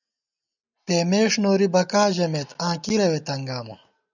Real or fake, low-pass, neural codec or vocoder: real; 7.2 kHz; none